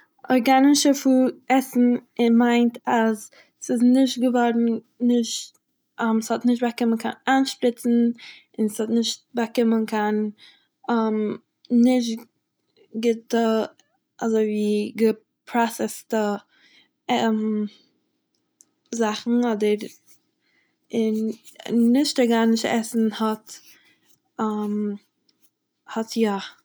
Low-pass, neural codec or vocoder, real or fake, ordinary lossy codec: none; none; real; none